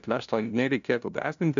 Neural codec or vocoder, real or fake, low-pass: codec, 16 kHz, 0.5 kbps, FunCodec, trained on LibriTTS, 25 frames a second; fake; 7.2 kHz